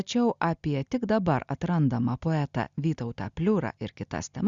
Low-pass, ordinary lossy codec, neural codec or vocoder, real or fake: 7.2 kHz; Opus, 64 kbps; none; real